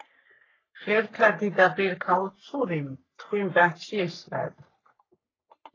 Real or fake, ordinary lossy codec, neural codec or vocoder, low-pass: fake; AAC, 32 kbps; codec, 44.1 kHz, 3.4 kbps, Pupu-Codec; 7.2 kHz